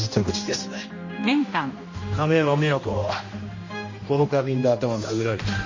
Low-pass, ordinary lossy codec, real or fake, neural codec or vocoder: 7.2 kHz; MP3, 32 kbps; fake; codec, 16 kHz, 1 kbps, X-Codec, HuBERT features, trained on general audio